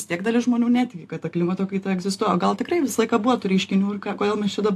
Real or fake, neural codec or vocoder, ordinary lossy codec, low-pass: real; none; AAC, 64 kbps; 14.4 kHz